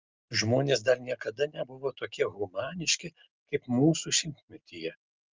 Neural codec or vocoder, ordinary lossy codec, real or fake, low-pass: none; Opus, 24 kbps; real; 7.2 kHz